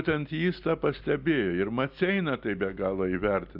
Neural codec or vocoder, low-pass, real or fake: none; 5.4 kHz; real